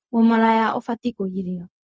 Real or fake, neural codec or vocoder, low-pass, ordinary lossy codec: fake; codec, 16 kHz, 0.4 kbps, LongCat-Audio-Codec; none; none